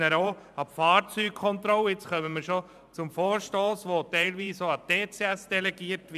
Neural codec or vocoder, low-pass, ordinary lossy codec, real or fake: vocoder, 44.1 kHz, 128 mel bands every 512 samples, BigVGAN v2; 14.4 kHz; none; fake